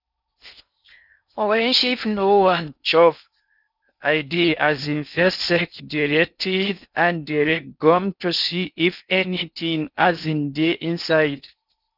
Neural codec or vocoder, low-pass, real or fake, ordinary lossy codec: codec, 16 kHz in and 24 kHz out, 0.6 kbps, FocalCodec, streaming, 4096 codes; 5.4 kHz; fake; none